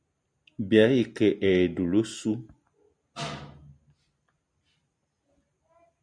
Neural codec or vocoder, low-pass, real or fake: none; 9.9 kHz; real